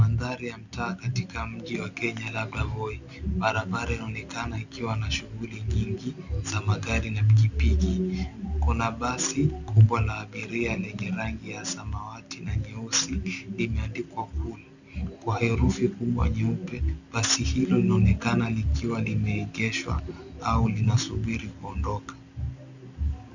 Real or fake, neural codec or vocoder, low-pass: real; none; 7.2 kHz